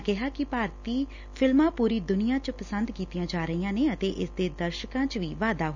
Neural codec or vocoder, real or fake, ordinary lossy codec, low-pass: none; real; none; 7.2 kHz